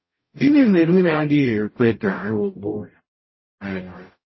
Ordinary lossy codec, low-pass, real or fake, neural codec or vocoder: MP3, 24 kbps; 7.2 kHz; fake; codec, 44.1 kHz, 0.9 kbps, DAC